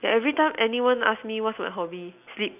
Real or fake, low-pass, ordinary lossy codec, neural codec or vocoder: real; 3.6 kHz; none; none